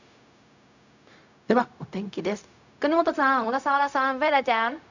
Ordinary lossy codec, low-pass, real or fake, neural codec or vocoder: none; 7.2 kHz; fake; codec, 16 kHz, 0.4 kbps, LongCat-Audio-Codec